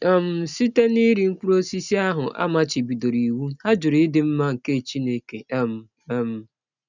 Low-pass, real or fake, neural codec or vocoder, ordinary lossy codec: 7.2 kHz; real; none; none